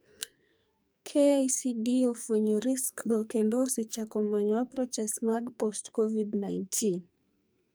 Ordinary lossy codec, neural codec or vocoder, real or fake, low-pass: none; codec, 44.1 kHz, 2.6 kbps, SNAC; fake; none